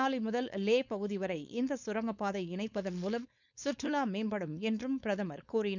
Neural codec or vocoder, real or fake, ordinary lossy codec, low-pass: codec, 16 kHz, 4.8 kbps, FACodec; fake; none; 7.2 kHz